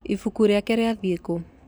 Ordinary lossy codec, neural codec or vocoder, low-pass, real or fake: none; none; none; real